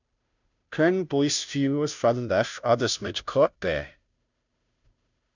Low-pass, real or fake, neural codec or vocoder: 7.2 kHz; fake; codec, 16 kHz, 0.5 kbps, FunCodec, trained on Chinese and English, 25 frames a second